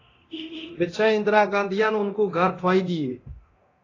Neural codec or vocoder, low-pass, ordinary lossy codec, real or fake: codec, 24 kHz, 0.9 kbps, DualCodec; 7.2 kHz; AAC, 32 kbps; fake